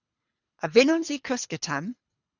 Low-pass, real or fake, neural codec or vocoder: 7.2 kHz; fake; codec, 24 kHz, 3 kbps, HILCodec